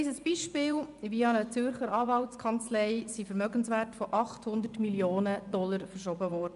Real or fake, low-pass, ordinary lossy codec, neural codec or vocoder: fake; 10.8 kHz; MP3, 96 kbps; vocoder, 24 kHz, 100 mel bands, Vocos